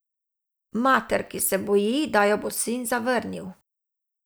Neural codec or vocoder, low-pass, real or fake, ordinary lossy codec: none; none; real; none